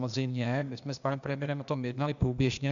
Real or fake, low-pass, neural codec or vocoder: fake; 7.2 kHz; codec, 16 kHz, 0.8 kbps, ZipCodec